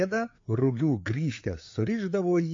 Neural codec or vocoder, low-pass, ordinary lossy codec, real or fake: codec, 16 kHz, 4 kbps, FreqCodec, larger model; 7.2 kHz; MP3, 48 kbps; fake